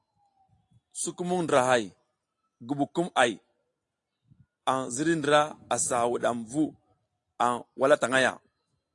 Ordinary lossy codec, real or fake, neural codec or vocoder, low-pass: AAC, 48 kbps; real; none; 10.8 kHz